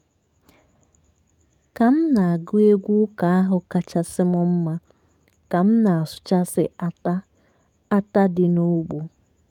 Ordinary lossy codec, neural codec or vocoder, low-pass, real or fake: none; codec, 44.1 kHz, 7.8 kbps, DAC; 19.8 kHz; fake